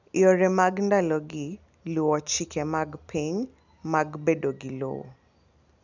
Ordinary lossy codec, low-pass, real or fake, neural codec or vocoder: none; 7.2 kHz; real; none